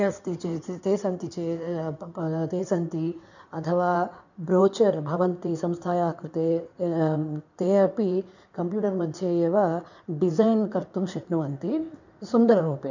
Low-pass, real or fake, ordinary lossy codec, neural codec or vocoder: 7.2 kHz; fake; none; codec, 16 kHz in and 24 kHz out, 2.2 kbps, FireRedTTS-2 codec